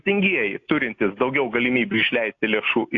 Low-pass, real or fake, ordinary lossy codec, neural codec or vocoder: 7.2 kHz; real; AAC, 48 kbps; none